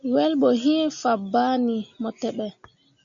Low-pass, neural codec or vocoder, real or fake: 7.2 kHz; none; real